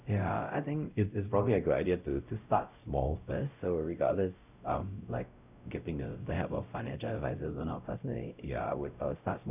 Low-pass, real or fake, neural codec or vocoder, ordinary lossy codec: 3.6 kHz; fake; codec, 16 kHz, 0.5 kbps, X-Codec, WavLM features, trained on Multilingual LibriSpeech; none